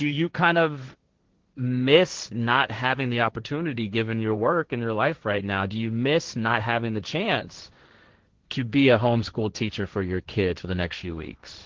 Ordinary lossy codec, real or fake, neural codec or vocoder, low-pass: Opus, 16 kbps; fake; codec, 16 kHz, 1.1 kbps, Voila-Tokenizer; 7.2 kHz